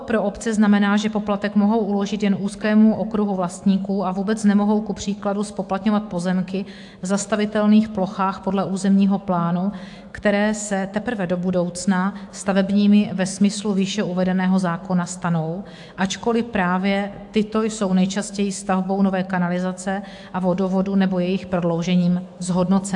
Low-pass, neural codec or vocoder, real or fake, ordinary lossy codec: 10.8 kHz; autoencoder, 48 kHz, 128 numbers a frame, DAC-VAE, trained on Japanese speech; fake; AAC, 64 kbps